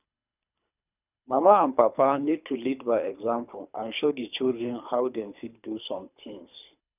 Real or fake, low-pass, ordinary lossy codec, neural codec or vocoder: fake; 3.6 kHz; none; codec, 24 kHz, 3 kbps, HILCodec